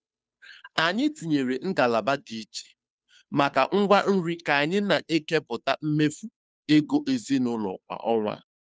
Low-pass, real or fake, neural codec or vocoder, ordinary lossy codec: none; fake; codec, 16 kHz, 2 kbps, FunCodec, trained on Chinese and English, 25 frames a second; none